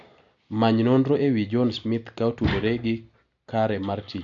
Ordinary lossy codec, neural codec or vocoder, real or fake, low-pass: AAC, 64 kbps; none; real; 7.2 kHz